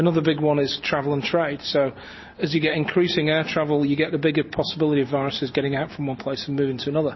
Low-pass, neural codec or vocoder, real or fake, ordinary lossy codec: 7.2 kHz; none; real; MP3, 24 kbps